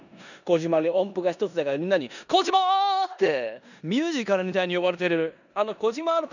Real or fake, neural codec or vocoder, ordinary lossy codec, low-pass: fake; codec, 16 kHz in and 24 kHz out, 0.9 kbps, LongCat-Audio-Codec, four codebook decoder; none; 7.2 kHz